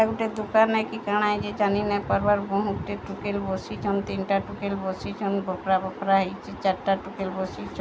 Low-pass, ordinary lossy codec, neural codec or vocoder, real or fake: none; none; none; real